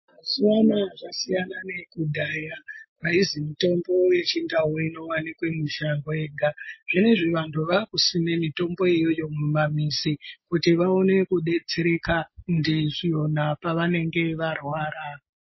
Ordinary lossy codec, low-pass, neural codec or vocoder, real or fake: MP3, 24 kbps; 7.2 kHz; none; real